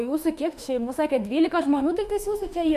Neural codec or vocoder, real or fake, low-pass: autoencoder, 48 kHz, 32 numbers a frame, DAC-VAE, trained on Japanese speech; fake; 14.4 kHz